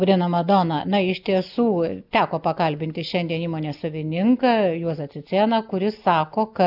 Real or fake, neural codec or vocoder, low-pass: real; none; 5.4 kHz